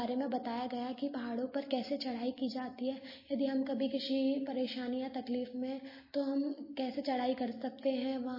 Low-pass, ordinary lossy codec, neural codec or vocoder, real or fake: 5.4 kHz; MP3, 24 kbps; none; real